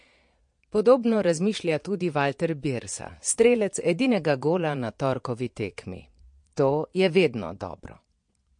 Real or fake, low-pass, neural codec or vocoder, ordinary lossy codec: fake; 9.9 kHz; vocoder, 22.05 kHz, 80 mel bands, Vocos; MP3, 48 kbps